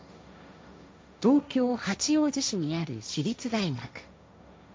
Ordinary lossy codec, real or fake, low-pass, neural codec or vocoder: none; fake; none; codec, 16 kHz, 1.1 kbps, Voila-Tokenizer